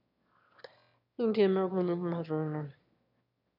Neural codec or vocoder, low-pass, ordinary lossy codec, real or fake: autoencoder, 22.05 kHz, a latent of 192 numbers a frame, VITS, trained on one speaker; 5.4 kHz; none; fake